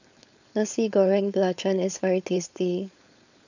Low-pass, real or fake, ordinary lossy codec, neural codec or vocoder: 7.2 kHz; fake; none; codec, 16 kHz, 4.8 kbps, FACodec